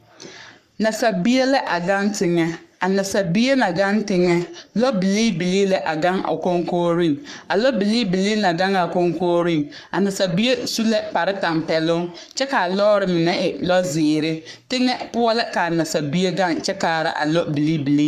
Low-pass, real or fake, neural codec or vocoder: 14.4 kHz; fake; codec, 44.1 kHz, 3.4 kbps, Pupu-Codec